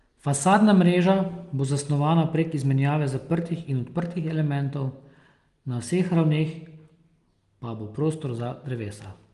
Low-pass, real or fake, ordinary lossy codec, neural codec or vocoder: 10.8 kHz; real; Opus, 24 kbps; none